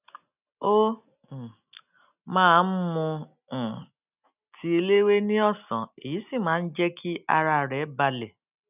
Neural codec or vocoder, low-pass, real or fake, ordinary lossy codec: none; 3.6 kHz; real; none